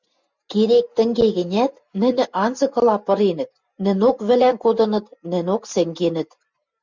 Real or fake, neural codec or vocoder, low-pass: real; none; 7.2 kHz